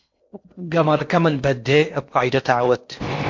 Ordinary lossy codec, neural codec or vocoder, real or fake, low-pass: MP3, 48 kbps; codec, 16 kHz in and 24 kHz out, 0.8 kbps, FocalCodec, streaming, 65536 codes; fake; 7.2 kHz